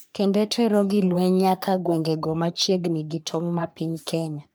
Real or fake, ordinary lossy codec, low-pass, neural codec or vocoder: fake; none; none; codec, 44.1 kHz, 3.4 kbps, Pupu-Codec